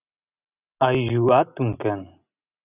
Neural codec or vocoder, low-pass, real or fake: none; 3.6 kHz; real